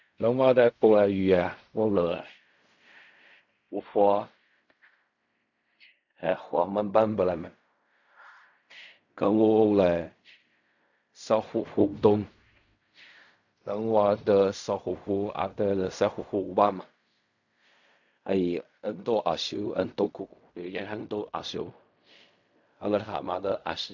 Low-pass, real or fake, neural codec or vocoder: 7.2 kHz; fake; codec, 16 kHz in and 24 kHz out, 0.4 kbps, LongCat-Audio-Codec, fine tuned four codebook decoder